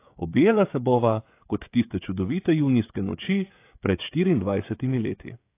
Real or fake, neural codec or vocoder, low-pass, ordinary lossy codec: fake; codec, 16 kHz, 16 kbps, FreqCodec, smaller model; 3.6 kHz; AAC, 24 kbps